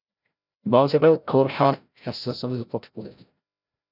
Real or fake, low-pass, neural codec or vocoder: fake; 5.4 kHz; codec, 16 kHz, 0.5 kbps, FreqCodec, larger model